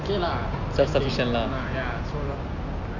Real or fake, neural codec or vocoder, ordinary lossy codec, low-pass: real; none; none; 7.2 kHz